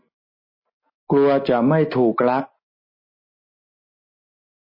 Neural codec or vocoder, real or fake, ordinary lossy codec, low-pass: none; real; MP3, 24 kbps; 5.4 kHz